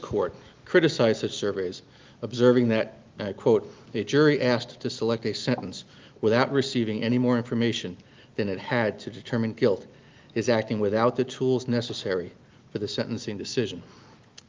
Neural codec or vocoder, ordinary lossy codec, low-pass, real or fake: none; Opus, 32 kbps; 7.2 kHz; real